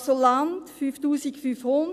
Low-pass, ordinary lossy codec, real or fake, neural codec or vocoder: 14.4 kHz; none; real; none